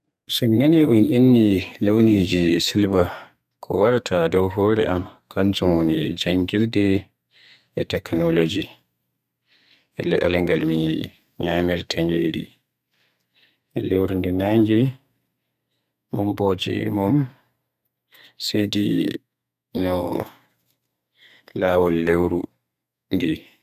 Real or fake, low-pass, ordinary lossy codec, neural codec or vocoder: fake; 14.4 kHz; none; codec, 32 kHz, 1.9 kbps, SNAC